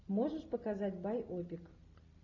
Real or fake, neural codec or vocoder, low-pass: real; none; 7.2 kHz